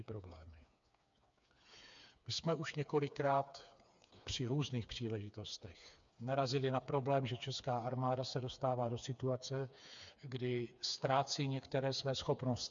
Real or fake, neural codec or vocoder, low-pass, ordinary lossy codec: fake; codec, 16 kHz, 4 kbps, FreqCodec, smaller model; 7.2 kHz; MP3, 64 kbps